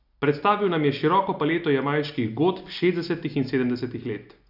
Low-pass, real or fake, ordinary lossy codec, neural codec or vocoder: 5.4 kHz; real; none; none